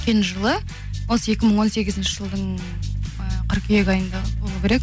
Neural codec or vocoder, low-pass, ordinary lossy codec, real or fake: none; none; none; real